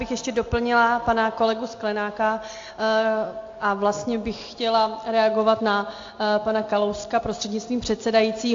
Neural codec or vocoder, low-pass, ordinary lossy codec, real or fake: none; 7.2 kHz; AAC, 48 kbps; real